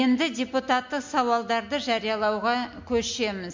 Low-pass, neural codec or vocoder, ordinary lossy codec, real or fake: 7.2 kHz; none; MP3, 48 kbps; real